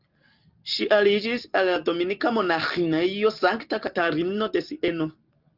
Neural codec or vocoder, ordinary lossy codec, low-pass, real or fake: none; Opus, 24 kbps; 5.4 kHz; real